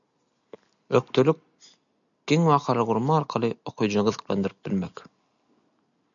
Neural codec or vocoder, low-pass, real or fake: none; 7.2 kHz; real